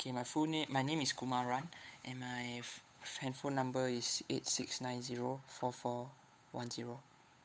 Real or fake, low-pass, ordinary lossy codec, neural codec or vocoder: fake; none; none; codec, 16 kHz, 8 kbps, FunCodec, trained on Chinese and English, 25 frames a second